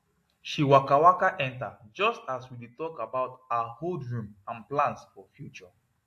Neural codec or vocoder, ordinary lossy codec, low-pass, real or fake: none; AAC, 64 kbps; 14.4 kHz; real